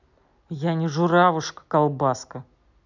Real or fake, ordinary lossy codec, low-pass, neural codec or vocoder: real; none; 7.2 kHz; none